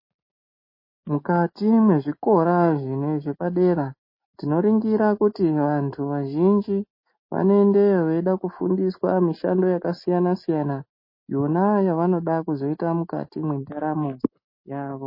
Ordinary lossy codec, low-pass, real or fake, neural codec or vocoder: MP3, 24 kbps; 5.4 kHz; real; none